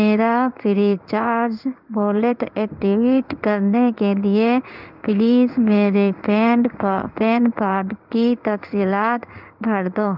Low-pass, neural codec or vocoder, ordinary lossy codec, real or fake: 5.4 kHz; codec, 16 kHz in and 24 kHz out, 1 kbps, XY-Tokenizer; none; fake